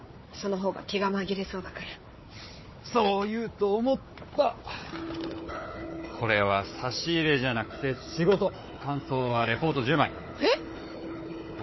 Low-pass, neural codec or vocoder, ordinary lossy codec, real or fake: 7.2 kHz; codec, 16 kHz, 4 kbps, FunCodec, trained on Chinese and English, 50 frames a second; MP3, 24 kbps; fake